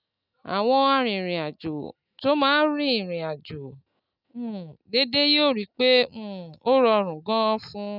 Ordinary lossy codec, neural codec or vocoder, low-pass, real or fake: none; none; 5.4 kHz; real